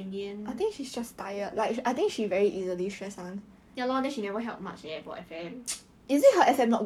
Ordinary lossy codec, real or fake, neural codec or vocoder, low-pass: none; fake; codec, 44.1 kHz, 7.8 kbps, Pupu-Codec; 19.8 kHz